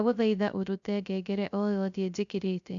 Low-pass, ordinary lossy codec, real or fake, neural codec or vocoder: 7.2 kHz; none; fake; codec, 16 kHz, 0.3 kbps, FocalCodec